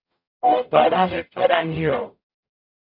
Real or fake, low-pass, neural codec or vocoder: fake; 5.4 kHz; codec, 44.1 kHz, 0.9 kbps, DAC